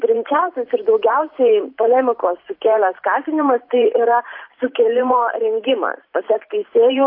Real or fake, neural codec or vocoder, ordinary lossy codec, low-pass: fake; vocoder, 44.1 kHz, 128 mel bands every 512 samples, BigVGAN v2; AAC, 32 kbps; 5.4 kHz